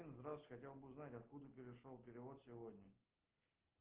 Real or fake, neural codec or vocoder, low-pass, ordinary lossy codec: real; none; 3.6 kHz; Opus, 16 kbps